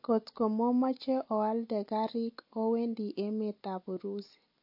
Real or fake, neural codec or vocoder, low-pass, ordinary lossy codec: real; none; 5.4 kHz; MP3, 32 kbps